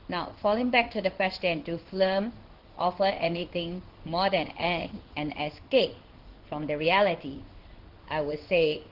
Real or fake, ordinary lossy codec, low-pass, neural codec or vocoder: fake; Opus, 16 kbps; 5.4 kHz; codec, 16 kHz in and 24 kHz out, 1 kbps, XY-Tokenizer